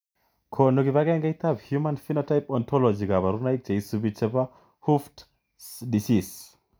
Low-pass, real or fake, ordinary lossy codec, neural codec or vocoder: none; real; none; none